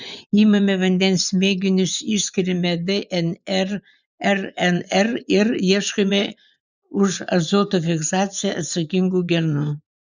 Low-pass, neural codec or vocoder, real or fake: 7.2 kHz; vocoder, 24 kHz, 100 mel bands, Vocos; fake